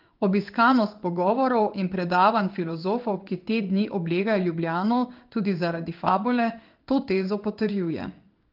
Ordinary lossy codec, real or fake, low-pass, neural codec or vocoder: Opus, 24 kbps; fake; 5.4 kHz; codec, 16 kHz in and 24 kHz out, 1 kbps, XY-Tokenizer